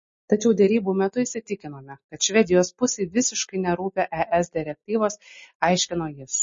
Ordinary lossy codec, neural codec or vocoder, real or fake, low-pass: MP3, 32 kbps; none; real; 7.2 kHz